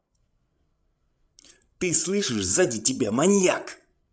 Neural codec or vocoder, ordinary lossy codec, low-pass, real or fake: codec, 16 kHz, 16 kbps, FreqCodec, larger model; none; none; fake